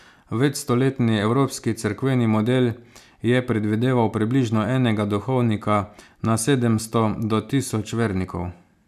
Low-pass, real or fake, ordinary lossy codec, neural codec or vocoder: 14.4 kHz; real; none; none